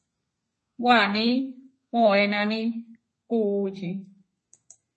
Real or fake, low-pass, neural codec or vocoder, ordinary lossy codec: fake; 10.8 kHz; codec, 32 kHz, 1.9 kbps, SNAC; MP3, 32 kbps